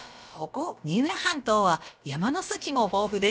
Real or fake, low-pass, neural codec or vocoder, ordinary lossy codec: fake; none; codec, 16 kHz, about 1 kbps, DyCAST, with the encoder's durations; none